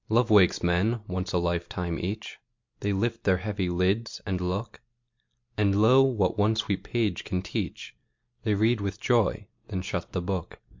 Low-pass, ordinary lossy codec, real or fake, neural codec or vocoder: 7.2 kHz; MP3, 48 kbps; real; none